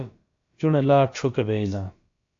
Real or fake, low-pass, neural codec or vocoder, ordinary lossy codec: fake; 7.2 kHz; codec, 16 kHz, about 1 kbps, DyCAST, with the encoder's durations; AAC, 48 kbps